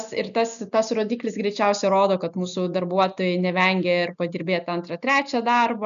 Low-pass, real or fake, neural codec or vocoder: 7.2 kHz; real; none